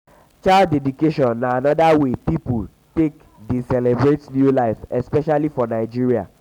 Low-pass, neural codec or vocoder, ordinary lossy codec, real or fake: 19.8 kHz; none; none; real